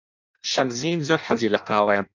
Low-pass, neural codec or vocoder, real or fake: 7.2 kHz; codec, 16 kHz in and 24 kHz out, 0.6 kbps, FireRedTTS-2 codec; fake